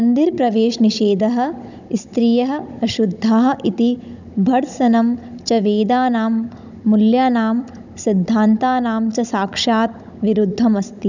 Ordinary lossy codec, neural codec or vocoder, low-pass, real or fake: none; codec, 16 kHz, 16 kbps, FunCodec, trained on Chinese and English, 50 frames a second; 7.2 kHz; fake